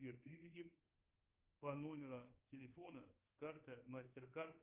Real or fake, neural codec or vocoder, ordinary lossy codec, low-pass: fake; codec, 24 kHz, 1.2 kbps, DualCodec; Opus, 24 kbps; 3.6 kHz